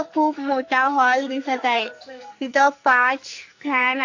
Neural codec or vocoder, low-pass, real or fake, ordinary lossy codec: codec, 44.1 kHz, 2.6 kbps, SNAC; 7.2 kHz; fake; AAC, 48 kbps